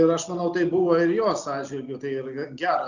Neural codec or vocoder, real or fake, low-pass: none; real; 7.2 kHz